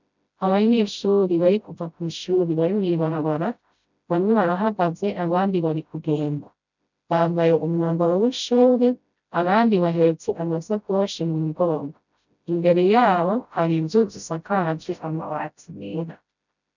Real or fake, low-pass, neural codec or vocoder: fake; 7.2 kHz; codec, 16 kHz, 0.5 kbps, FreqCodec, smaller model